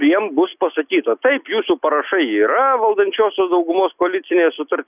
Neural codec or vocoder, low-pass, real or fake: none; 3.6 kHz; real